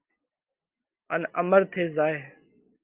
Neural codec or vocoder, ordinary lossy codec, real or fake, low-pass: none; Opus, 24 kbps; real; 3.6 kHz